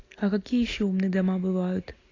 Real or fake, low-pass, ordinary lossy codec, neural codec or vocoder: real; 7.2 kHz; AAC, 32 kbps; none